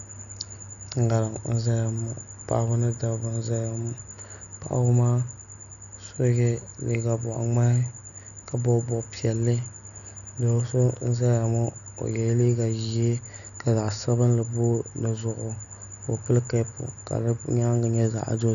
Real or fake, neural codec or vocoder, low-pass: real; none; 7.2 kHz